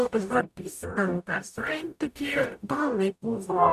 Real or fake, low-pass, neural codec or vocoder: fake; 14.4 kHz; codec, 44.1 kHz, 0.9 kbps, DAC